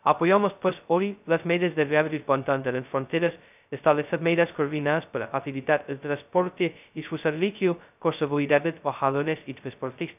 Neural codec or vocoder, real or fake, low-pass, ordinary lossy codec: codec, 16 kHz, 0.2 kbps, FocalCodec; fake; 3.6 kHz; none